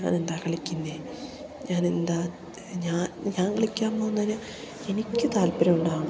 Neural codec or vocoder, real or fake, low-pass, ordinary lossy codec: none; real; none; none